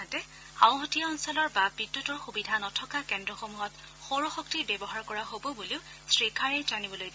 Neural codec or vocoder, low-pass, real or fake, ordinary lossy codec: none; none; real; none